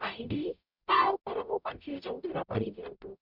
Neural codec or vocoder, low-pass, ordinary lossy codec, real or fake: codec, 44.1 kHz, 0.9 kbps, DAC; 5.4 kHz; none; fake